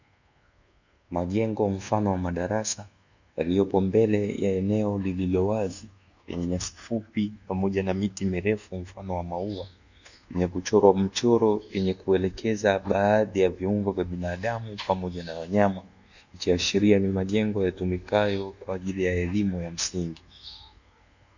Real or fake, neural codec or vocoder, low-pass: fake; codec, 24 kHz, 1.2 kbps, DualCodec; 7.2 kHz